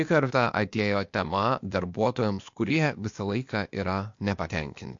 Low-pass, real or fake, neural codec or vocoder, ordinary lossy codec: 7.2 kHz; fake; codec, 16 kHz, 0.8 kbps, ZipCodec; MP3, 48 kbps